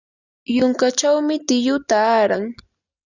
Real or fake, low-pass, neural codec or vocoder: real; 7.2 kHz; none